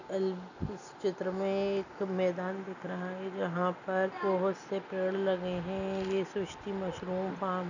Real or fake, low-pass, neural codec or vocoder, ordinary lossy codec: real; 7.2 kHz; none; none